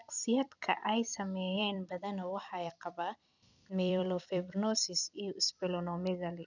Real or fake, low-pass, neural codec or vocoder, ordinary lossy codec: fake; 7.2 kHz; vocoder, 44.1 kHz, 128 mel bands every 256 samples, BigVGAN v2; none